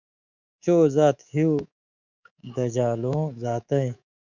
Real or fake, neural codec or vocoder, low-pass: fake; codec, 24 kHz, 3.1 kbps, DualCodec; 7.2 kHz